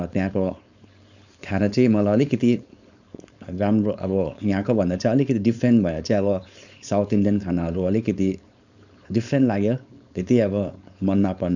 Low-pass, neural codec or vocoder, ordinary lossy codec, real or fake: 7.2 kHz; codec, 16 kHz, 4.8 kbps, FACodec; none; fake